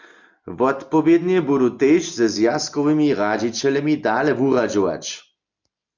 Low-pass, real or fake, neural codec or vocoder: 7.2 kHz; real; none